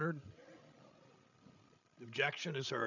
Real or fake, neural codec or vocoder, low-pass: fake; codec, 16 kHz, 16 kbps, FreqCodec, larger model; 7.2 kHz